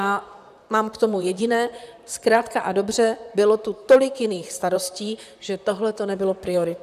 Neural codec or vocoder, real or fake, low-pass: vocoder, 44.1 kHz, 128 mel bands, Pupu-Vocoder; fake; 14.4 kHz